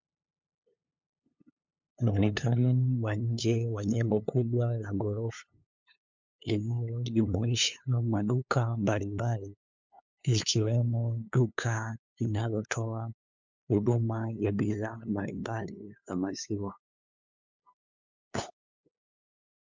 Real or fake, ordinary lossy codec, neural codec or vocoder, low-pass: fake; MP3, 64 kbps; codec, 16 kHz, 2 kbps, FunCodec, trained on LibriTTS, 25 frames a second; 7.2 kHz